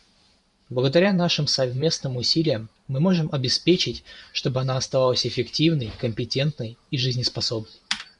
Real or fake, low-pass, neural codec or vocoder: fake; 10.8 kHz; vocoder, 44.1 kHz, 128 mel bands every 512 samples, BigVGAN v2